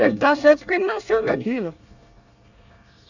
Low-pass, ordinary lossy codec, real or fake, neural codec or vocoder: 7.2 kHz; none; fake; codec, 24 kHz, 1 kbps, SNAC